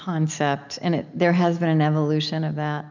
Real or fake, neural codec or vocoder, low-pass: real; none; 7.2 kHz